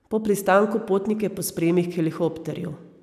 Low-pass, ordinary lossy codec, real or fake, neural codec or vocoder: 14.4 kHz; none; real; none